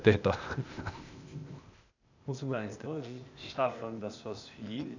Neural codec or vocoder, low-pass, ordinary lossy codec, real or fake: codec, 16 kHz, 0.8 kbps, ZipCodec; 7.2 kHz; none; fake